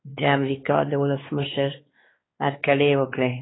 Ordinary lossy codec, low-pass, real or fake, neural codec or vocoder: AAC, 16 kbps; 7.2 kHz; fake; codec, 16 kHz, 2 kbps, X-Codec, WavLM features, trained on Multilingual LibriSpeech